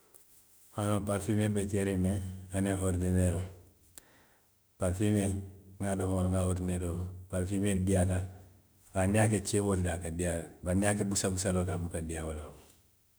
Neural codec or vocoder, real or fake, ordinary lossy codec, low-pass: autoencoder, 48 kHz, 32 numbers a frame, DAC-VAE, trained on Japanese speech; fake; none; none